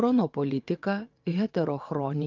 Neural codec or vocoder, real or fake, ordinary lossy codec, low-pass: vocoder, 22.05 kHz, 80 mel bands, WaveNeXt; fake; Opus, 24 kbps; 7.2 kHz